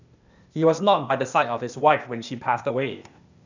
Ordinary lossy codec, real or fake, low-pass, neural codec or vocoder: none; fake; 7.2 kHz; codec, 16 kHz, 0.8 kbps, ZipCodec